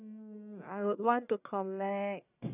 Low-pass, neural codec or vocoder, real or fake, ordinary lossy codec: 3.6 kHz; codec, 44.1 kHz, 1.7 kbps, Pupu-Codec; fake; none